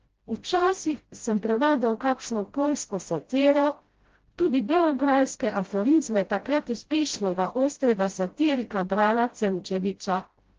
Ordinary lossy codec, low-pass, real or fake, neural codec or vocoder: Opus, 16 kbps; 7.2 kHz; fake; codec, 16 kHz, 0.5 kbps, FreqCodec, smaller model